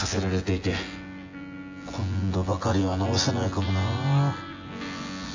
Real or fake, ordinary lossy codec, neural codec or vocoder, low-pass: fake; AAC, 48 kbps; vocoder, 24 kHz, 100 mel bands, Vocos; 7.2 kHz